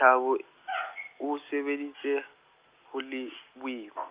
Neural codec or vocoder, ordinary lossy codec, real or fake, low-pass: none; Opus, 24 kbps; real; 3.6 kHz